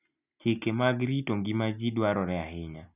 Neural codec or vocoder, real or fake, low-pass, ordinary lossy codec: none; real; 3.6 kHz; none